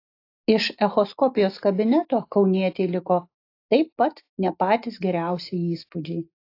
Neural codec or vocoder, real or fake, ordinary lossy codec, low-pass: none; real; AAC, 32 kbps; 5.4 kHz